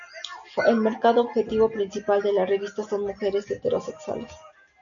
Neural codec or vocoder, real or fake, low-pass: none; real; 7.2 kHz